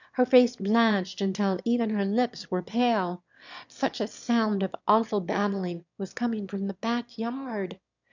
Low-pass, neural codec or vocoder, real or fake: 7.2 kHz; autoencoder, 22.05 kHz, a latent of 192 numbers a frame, VITS, trained on one speaker; fake